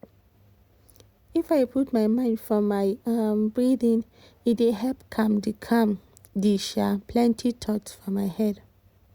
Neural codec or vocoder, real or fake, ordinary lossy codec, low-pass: none; real; Opus, 64 kbps; 19.8 kHz